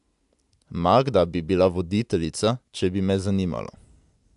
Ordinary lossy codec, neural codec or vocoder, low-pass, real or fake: AAC, 96 kbps; none; 10.8 kHz; real